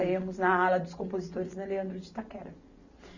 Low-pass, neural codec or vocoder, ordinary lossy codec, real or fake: 7.2 kHz; none; none; real